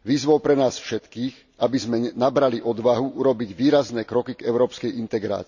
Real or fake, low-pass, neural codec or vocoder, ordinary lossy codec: real; 7.2 kHz; none; none